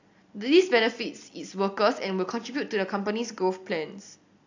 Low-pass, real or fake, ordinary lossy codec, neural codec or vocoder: 7.2 kHz; real; AAC, 48 kbps; none